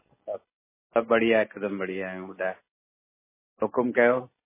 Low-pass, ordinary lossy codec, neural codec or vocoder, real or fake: 3.6 kHz; MP3, 16 kbps; none; real